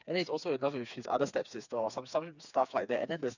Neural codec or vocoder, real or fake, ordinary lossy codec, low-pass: codec, 16 kHz, 4 kbps, FreqCodec, smaller model; fake; none; 7.2 kHz